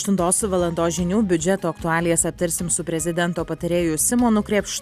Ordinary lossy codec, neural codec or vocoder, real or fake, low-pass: Opus, 64 kbps; none; real; 14.4 kHz